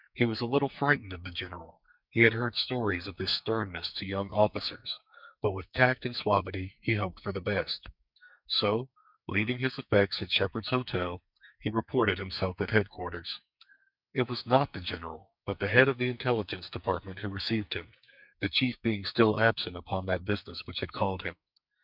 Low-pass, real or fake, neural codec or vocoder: 5.4 kHz; fake; codec, 44.1 kHz, 2.6 kbps, SNAC